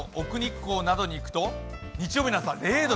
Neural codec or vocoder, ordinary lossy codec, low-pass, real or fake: none; none; none; real